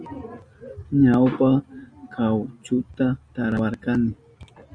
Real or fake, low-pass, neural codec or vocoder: real; 9.9 kHz; none